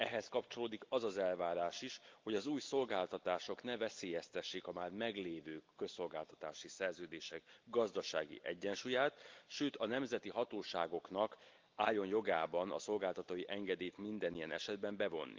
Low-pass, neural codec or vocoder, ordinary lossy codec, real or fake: 7.2 kHz; none; Opus, 24 kbps; real